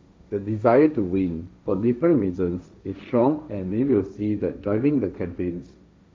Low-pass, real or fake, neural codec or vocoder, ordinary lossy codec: none; fake; codec, 16 kHz, 1.1 kbps, Voila-Tokenizer; none